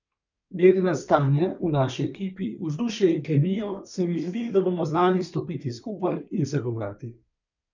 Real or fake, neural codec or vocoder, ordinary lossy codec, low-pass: fake; codec, 24 kHz, 1 kbps, SNAC; none; 7.2 kHz